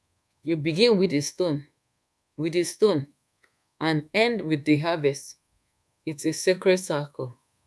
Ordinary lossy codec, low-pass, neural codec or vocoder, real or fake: none; none; codec, 24 kHz, 1.2 kbps, DualCodec; fake